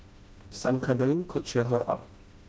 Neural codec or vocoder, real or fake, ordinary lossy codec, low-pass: codec, 16 kHz, 1 kbps, FreqCodec, smaller model; fake; none; none